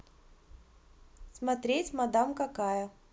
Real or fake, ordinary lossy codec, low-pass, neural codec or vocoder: real; none; none; none